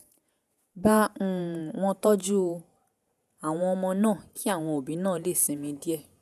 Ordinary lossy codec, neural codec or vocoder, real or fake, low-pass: none; vocoder, 48 kHz, 128 mel bands, Vocos; fake; 14.4 kHz